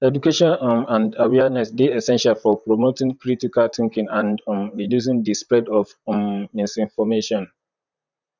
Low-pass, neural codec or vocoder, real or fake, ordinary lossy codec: 7.2 kHz; vocoder, 44.1 kHz, 128 mel bands, Pupu-Vocoder; fake; none